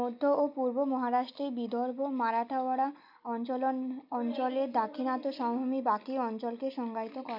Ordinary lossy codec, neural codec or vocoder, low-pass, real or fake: none; none; 5.4 kHz; real